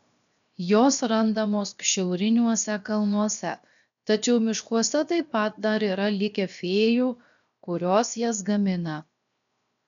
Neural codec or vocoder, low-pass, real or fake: codec, 16 kHz, 0.7 kbps, FocalCodec; 7.2 kHz; fake